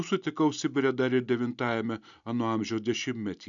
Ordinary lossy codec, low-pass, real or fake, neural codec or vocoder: MP3, 96 kbps; 7.2 kHz; real; none